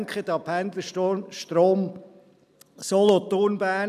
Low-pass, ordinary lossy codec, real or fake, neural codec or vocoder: 14.4 kHz; none; real; none